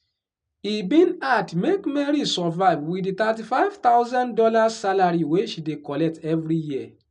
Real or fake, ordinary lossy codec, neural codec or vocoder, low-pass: real; none; none; 9.9 kHz